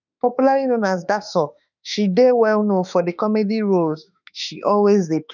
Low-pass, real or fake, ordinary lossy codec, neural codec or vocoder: 7.2 kHz; fake; none; autoencoder, 48 kHz, 32 numbers a frame, DAC-VAE, trained on Japanese speech